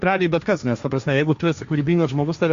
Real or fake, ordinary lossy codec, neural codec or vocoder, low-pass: fake; Opus, 64 kbps; codec, 16 kHz, 1.1 kbps, Voila-Tokenizer; 7.2 kHz